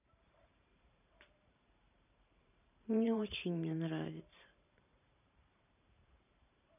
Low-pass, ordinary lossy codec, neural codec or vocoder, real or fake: 3.6 kHz; none; vocoder, 44.1 kHz, 128 mel bands every 512 samples, BigVGAN v2; fake